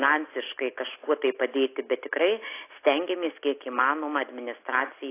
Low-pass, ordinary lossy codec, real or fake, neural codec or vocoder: 3.6 kHz; AAC, 24 kbps; real; none